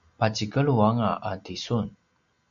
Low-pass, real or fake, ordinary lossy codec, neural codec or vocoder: 7.2 kHz; real; MP3, 64 kbps; none